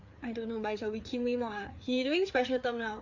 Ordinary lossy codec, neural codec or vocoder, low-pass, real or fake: AAC, 48 kbps; codec, 16 kHz, 4 kbps, FreqCodec, larger model; 7.2 kHz; fake